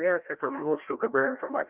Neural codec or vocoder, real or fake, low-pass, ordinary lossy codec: codec, 16 kHz, 1 kbps, FreqCodec, larger model; fake; 3.6 kHz; Opus, 32 kbps